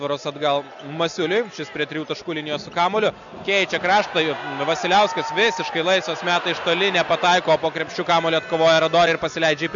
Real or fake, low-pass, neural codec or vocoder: real; 7.2 kHz; none